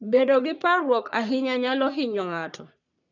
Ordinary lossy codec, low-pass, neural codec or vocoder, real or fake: none; 7.2 kHz; codec, 44.1 kHz, 3.4 kbps, Pupu-Codec; fake